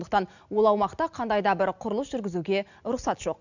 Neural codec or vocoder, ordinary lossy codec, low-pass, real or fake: none; none; 7.2 kHz; real